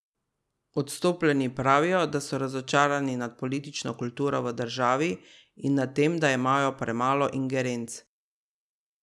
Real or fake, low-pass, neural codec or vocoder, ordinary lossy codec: real; none; none; none